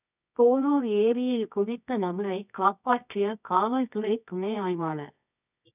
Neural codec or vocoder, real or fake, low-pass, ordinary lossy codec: codec, 24 kHz, 0.9 kbps, WavTokenizer, medium music audio release; fake; 3.6 kHz; none